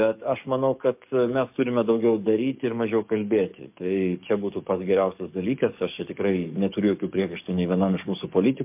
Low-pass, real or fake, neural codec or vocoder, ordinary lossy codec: 3.6 kHz; fake; codec, 44.1 kHz, 7.8 kbps, DAC; MP3, 32 kbps